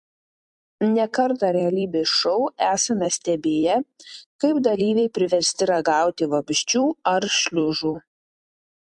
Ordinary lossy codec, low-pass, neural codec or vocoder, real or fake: MP3, 64 kbps; 10.8 kHz; vocoder, 44.1 kHz, 128 mel bands every 256 samples, BigVGAN v2; fake